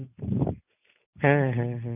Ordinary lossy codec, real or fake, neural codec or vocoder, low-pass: none; real; none; 3.6 kHz